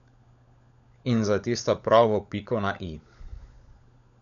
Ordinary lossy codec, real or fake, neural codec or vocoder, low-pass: none; fake; codec, 16 kHz, 16 kbps, FunCodec, trained on LibriTTS, 50 frames a second; 7.2 kHz